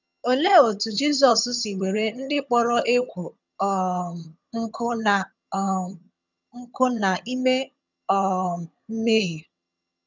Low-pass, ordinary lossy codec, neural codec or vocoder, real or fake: 7.2 kHz; none; vocoder, 22.05 kHz, 80 mel bands, HiFi-GAN; fake